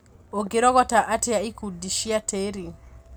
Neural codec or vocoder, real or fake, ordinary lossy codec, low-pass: none; real; none; none